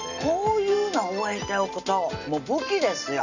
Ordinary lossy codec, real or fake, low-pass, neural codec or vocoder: none; real; 7.2 kHz; none